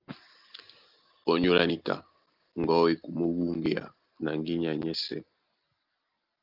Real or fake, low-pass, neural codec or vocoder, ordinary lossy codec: real; 5.4 kHz; none; Opus, 32 kbps